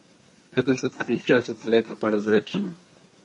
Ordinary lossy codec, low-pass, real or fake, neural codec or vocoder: MP3, 48 kbps; 10.8 kHz; fake; codec, 24 kHz, 1 kbps, SNAC